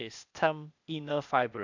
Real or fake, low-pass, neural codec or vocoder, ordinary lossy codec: fake; 7.2 kHz; codec, 16 kHz, 0.8 kbps, ZipCodec; none